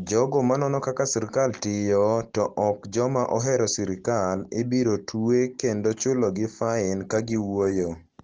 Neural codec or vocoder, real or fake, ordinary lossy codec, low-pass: none; real; Opus, 16 kbps; 7.2 kHz